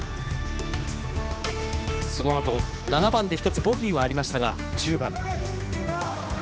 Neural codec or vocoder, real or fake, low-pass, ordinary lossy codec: codec, 16 kHz, 2 kbps, X-Codec, HuBERT features, trained on general audio; fake; none; none